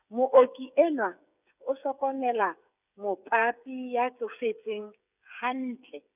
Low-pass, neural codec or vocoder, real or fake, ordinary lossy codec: 3.6 kHz; codec, 16 kHz, 8 kbps, FreqCodec, smaller model; fake; none